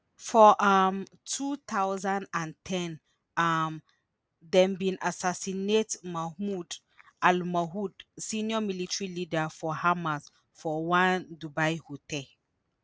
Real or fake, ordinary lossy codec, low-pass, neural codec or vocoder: real; none; none; none